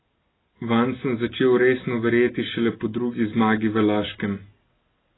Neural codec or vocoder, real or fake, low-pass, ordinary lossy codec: none; real; 7.2 kHz; AAC, 16 kbps